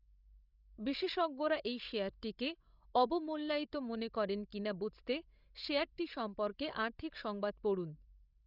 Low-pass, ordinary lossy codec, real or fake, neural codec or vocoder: 5.4 kHz; none; fake; codec, 16 kHz, 16 kbps, FreqCodec, larger model